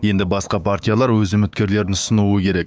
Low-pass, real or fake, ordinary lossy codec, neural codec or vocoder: none; fake; none; codec, 16 kHz, 6 kbps, DAC